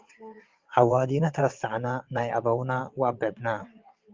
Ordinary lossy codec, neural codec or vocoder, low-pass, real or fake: Opus, 24 kbps; vocoder, 22.05 kHz, 80 mel bands, WaveNeXt; 7.2 kHz; fake